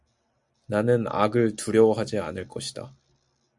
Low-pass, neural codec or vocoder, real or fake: 10.8 kHz; none; real